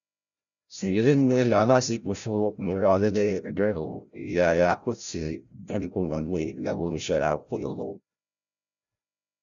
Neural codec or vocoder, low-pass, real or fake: codec, 16 kHz, 0.5 kbps, FreqCodec, larger model; 7.2 kHz; fake